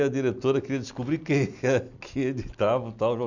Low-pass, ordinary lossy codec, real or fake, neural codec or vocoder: 7.2 kHz; none; real; none